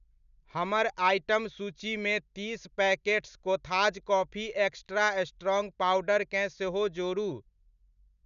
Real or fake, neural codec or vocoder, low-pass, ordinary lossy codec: real; none; 7.2 kHz; none